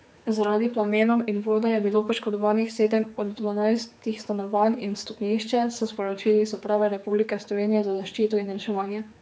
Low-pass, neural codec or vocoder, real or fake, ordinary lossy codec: none; codec, 16 kHz, 4 kbps, X-Codec, HuBERT features, trained on general audio; fake; none